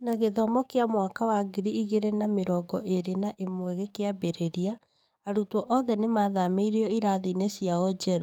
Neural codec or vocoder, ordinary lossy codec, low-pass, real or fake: codec, 44.1 kHz, 7.8 kbps, DAC; none; 19.8 kHz; fake